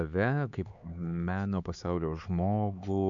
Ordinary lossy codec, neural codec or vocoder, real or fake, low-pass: Opus, 64 kbps; codec, 16 kHz, 4 kbps, X-Codec, HuBERT features, trained on LibriSpeech; fake; 7.2 kHz